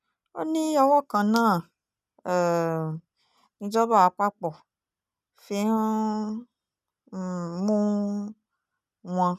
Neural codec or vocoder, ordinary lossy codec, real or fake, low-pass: none; none; real; 14.4 kHz